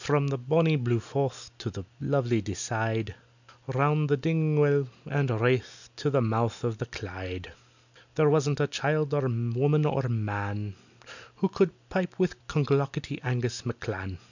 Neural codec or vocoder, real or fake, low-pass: none; real; 7.2 kHz